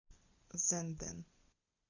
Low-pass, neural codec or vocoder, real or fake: 7.2 kHz; none; real